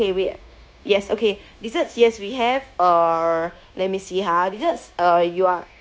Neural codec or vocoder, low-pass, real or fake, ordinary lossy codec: codec, 16 kHz, 0.9 kbps, LongCat-Audio-Codec; none; fake; none